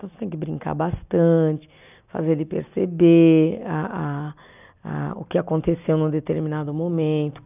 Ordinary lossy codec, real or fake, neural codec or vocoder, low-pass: none; real; none; 3.6 kHz